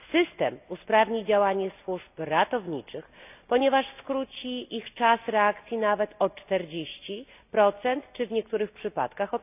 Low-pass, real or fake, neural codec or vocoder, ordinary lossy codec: 3.6 kHz; real; none; none